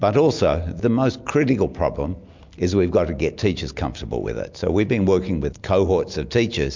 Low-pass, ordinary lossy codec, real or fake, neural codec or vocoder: 7.2 kHz; MP3, 64 kbps; real; none